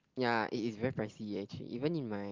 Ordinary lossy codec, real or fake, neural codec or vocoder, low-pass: Opus, 16 kbps; real; none; 7.2 kHz